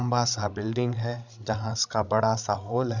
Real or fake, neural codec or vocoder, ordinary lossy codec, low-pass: fake; codec, 16 kHz, 16 kbps, FreqCodec, larger model; none; 7.2 kHz